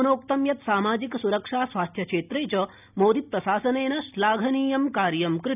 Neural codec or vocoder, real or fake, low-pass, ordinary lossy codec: none; real; 3.6 kHz; none